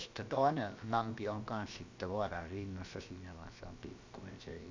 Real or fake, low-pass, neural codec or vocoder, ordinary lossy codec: fake; 7.2 kHz; codec, 16 kHz, about 1 kbps, DyCAST, with the encoder's durations; MP3, 64 kbps